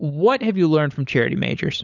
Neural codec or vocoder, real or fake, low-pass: codec, 16 kHz, 16 kbps, FunCodec, trained on LibriTTS, 50 frames a second; fake; 7.2 kHz